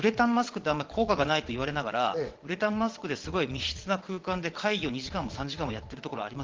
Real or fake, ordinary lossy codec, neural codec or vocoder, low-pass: fake; Opus, 16 kbps; vocoder, 22.05 kHz, 80 mel bands, WaveNeXt; 7.2 kHz